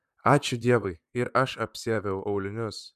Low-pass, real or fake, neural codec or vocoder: 14.4 kHz; fake; vocoder, 44.1 kHz, 128 mel bands, Pupu-Vocoder